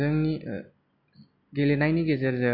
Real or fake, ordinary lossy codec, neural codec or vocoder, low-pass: real; MP3, 48 kbps; none; 5.4 kHz